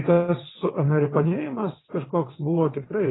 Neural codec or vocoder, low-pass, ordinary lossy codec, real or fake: vocoder, 22.05 kHz, 80 mel bands, WaveNeXt; 7.2 kHz; AAC, 16 kbps; fake